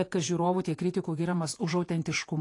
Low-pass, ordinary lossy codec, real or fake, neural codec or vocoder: 10.8 kHz; AAC, 48 kbps; fake; vocoder, 48 kHz, 128 mel bands, Vocos